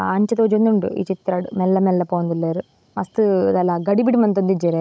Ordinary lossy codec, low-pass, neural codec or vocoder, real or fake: none; none; codec, 16 kHz, 16 kbps, FunCodec, trained on Chinese and English, 50 frames a second; fake